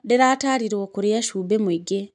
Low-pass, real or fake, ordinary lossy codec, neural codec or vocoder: 10.8 kHz; real; none; none